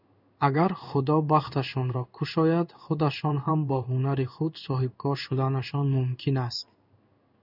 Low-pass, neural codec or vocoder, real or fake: 5.4 kHz; codec, 16 kHz in and 24 kHz out, 1 kbps, XY-Tokenizer; fake